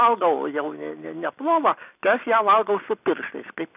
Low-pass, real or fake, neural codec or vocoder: 3.6 kHz; real; none